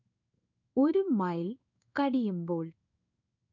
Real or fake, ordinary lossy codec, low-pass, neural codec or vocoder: fake; none; 7.2 kHz; codec, 16 kHz in and 24 kHz out, 1 kbps, XY-Tokenizer